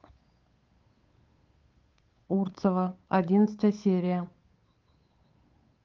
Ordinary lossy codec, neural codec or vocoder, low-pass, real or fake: Opus, 32 kbps; codec, 16 kHz, 8 kbps, FunCodec, trained on Chinese and English, 25 frames a second; 7.2 kHz; fake